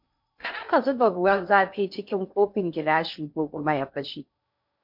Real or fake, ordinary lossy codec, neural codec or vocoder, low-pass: fake; MP3, 48 kbps; codec, 16 kHz in and 24 kHz out, 0.6 kbps, FocalCodec, streaming, 2048 codes; 5.4 kHz